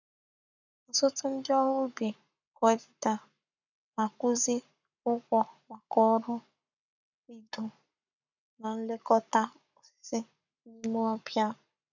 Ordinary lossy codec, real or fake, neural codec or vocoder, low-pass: none; fake; codec, 44.1 kHz, 7.8 kbps, Pupu-Codec; 7.2 kHz